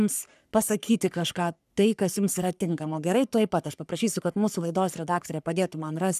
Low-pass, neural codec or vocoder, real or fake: 14.4 kHz; codec, 44.1 kHz, 3.4 kbps, Pupu-Codec; fake